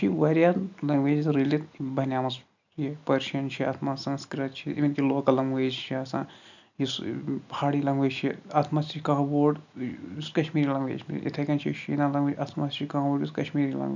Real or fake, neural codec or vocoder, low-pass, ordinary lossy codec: real; none; 7.2 kHz; none